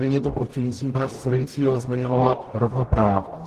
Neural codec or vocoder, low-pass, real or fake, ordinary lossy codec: codec, 44.1 kHz, 0.9 kbps, DAC; 14.4 kHz; fake; Opus, 16 kbps